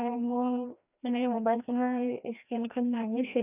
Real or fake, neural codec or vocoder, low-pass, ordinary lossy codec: fake; codec, 16 kHz, 1 kbps, FreqCodec, larger model; 3.6 kHz; none